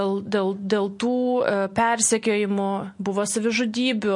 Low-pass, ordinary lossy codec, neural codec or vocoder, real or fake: 10.8 kHz; MP3, 48 kbps; none; real